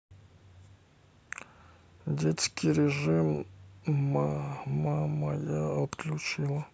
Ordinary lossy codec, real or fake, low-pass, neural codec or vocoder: none; real; none; none